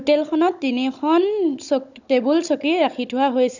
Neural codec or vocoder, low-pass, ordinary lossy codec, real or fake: codec, 16 kHz, 16 kbps, FunCodec, trained on Chinese and English, 50 frames a second; 7.2 kHz; none; fake